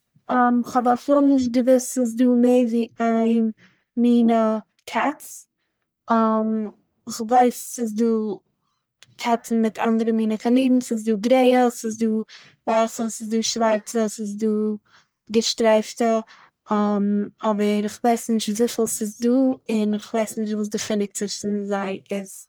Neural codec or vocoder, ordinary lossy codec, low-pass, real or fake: codec, 44.1 kHz, 1.7 kbps, Pupu-Codec; none; none; fake